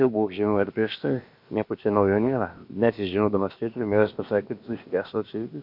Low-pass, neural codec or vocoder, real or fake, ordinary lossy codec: 5.4 kHz; codec, 16 kHz, about 1 kbps, DyCAST, with the encoder's durations; fake; MP3, 48 kbps